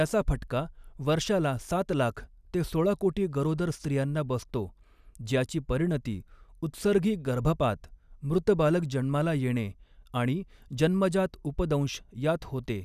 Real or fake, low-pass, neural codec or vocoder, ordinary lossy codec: real; 14.4 kHz; none; none